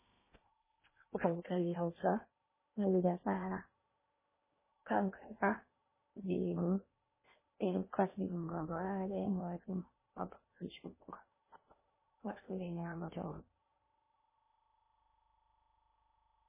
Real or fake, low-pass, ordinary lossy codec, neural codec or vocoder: fake; 3.6 kHz; MP3, 16 kbps; codec, 16 kHz in and 24 kHz out, 0.8 kbps, FocalCodec, streaming, 65536 codes